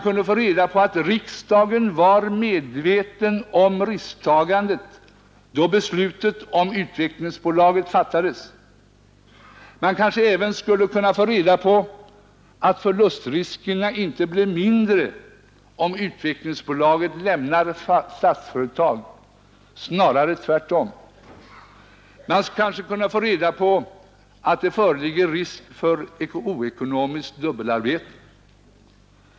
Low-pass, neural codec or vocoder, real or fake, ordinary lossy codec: none; none; real; none